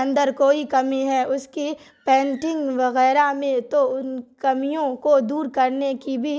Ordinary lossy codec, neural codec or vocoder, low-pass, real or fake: none; none; none; real